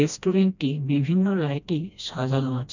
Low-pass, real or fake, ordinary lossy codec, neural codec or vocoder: 7.2 kHz; fake; none; codec, 16 kHz, 1 kbps, FreqCodec, smaller model